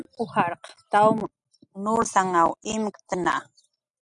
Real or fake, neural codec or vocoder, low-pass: real; none; 10.8 kHz